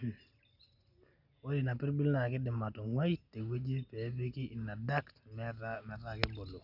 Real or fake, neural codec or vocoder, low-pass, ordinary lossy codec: real; none; 5.4 kHz; none